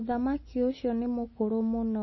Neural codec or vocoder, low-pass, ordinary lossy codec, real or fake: none; 7.2 kHz; MP3, 24 kbps; real